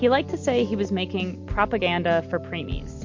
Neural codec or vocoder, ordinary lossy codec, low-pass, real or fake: none; MP3, 48 kbps; 7.2 kHz; real